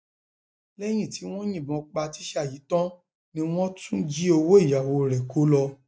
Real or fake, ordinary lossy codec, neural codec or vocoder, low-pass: real; none; none; none